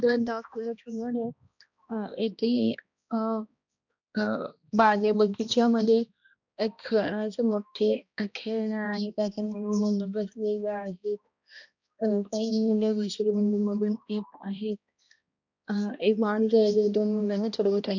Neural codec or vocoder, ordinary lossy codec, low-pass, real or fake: codec, 16 kHz, 1 kbps, X-Codec, HuBERT features, trained on balanced general audio; none; 7.2 kHz; fake